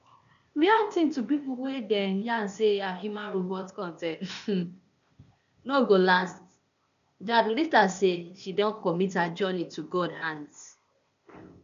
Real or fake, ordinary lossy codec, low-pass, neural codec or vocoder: fake; none; 7.2 kHz; codec, 16 kHz, 0.8 kbps, ZipCodec